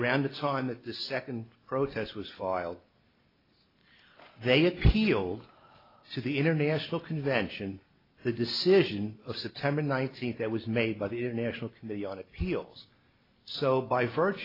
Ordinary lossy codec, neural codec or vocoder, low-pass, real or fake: AAC, 24 kbps; none; 5.4 kHz; real